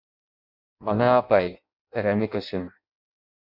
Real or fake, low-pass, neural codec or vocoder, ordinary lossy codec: fake; 5.4 kHz; codec, 16 kHz in and 24 kHz out, 0.6 kbps, FireRedTTS-2 codec; MP3, 48 kbps